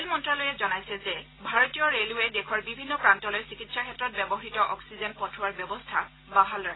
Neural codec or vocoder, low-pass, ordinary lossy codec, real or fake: none; 7.2 kHz; AAC, 16 kbps; real